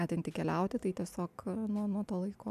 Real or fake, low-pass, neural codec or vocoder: real; 14.4 kHz; none